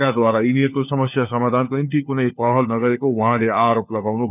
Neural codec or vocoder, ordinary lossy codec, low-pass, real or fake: codec, 16 kHz, 4 kbps, FunCodec, trained on LibriTTS, 50 frames a second; none; 3.6 kHz; fake